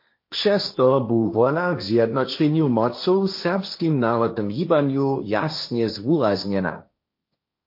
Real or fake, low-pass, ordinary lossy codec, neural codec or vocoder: fake; 5.4 kHz; MP3, 32 kbps; codec, 16 kHz, 1.1 kbps, Voila-Tokenizer